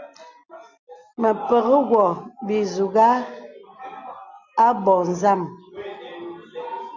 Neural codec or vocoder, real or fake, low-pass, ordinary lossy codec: none; real; 7.2 kHz; Opus, 64 kbps